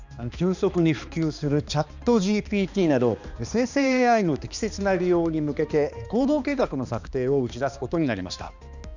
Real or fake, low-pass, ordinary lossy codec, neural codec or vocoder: fake; 7.2 kHz; none; codec, 16 kHz, 2 kbps, X-Codec, HuBERT features, trained on balanced general audio